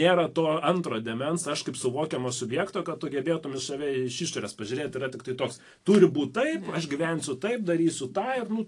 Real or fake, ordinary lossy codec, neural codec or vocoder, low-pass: real; AAC, 48 kbps; none; 10.8 kHz